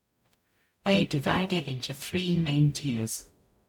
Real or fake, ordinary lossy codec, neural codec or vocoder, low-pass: fake; none; codec, 44.1 kHz, 0.9 kbps, DAC; 19.8 kHz